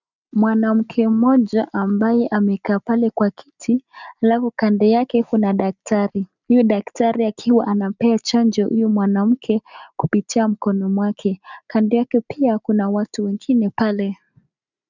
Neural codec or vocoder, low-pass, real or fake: none; 7.2 kHz; real